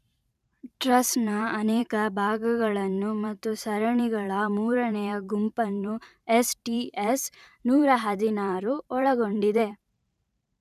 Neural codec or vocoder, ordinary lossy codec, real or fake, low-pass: vocoder, 44.1 kHz, 128 mel bands every 512 samples, BigVGAN v2; none; fake; 14.4 kHz